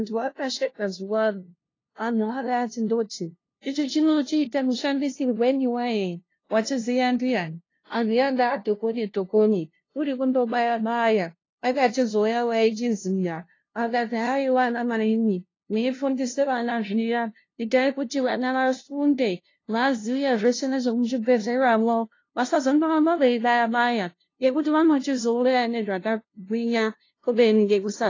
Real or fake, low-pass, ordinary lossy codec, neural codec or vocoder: fake; 7.2 kHz; AAC, 32 kbps; codec, 16 kHz, 0.5 kbps, FunCodec, trained on LibriTTS, 25 frames a second